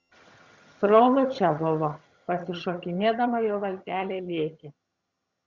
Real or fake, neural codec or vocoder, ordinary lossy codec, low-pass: fake; vocoder, 22.05 kHz, 80 mel bands, HiFi-GAN; Opus, 64 kbps; 7.2 kHz